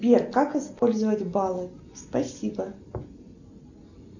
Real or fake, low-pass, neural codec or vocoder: real; 7.2 kHz; none